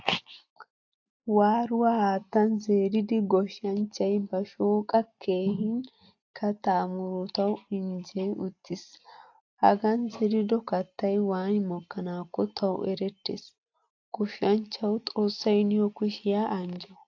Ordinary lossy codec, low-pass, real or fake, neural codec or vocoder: AAC, 48 kbps; 7.2 kHz; real; none